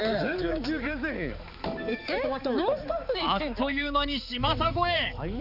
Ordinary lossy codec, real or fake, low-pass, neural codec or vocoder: none; fake; 5.4 kHz; codec, 16 kHz, 4 kbps, X-Codec, HuBERT features, trained on balanced general audio